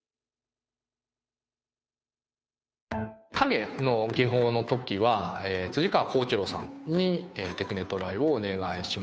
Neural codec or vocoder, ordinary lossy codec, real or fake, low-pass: codec, 16 kHz, 2 kbps, FunCodec, trained on Chinese and English, 25 frames a second; none; fake; none